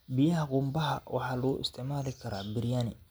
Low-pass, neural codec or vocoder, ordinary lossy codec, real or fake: none; none; none; real